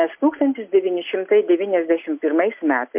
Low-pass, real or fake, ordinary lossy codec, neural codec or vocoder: 3.6 kHz; real; MP3, 32 kbps; none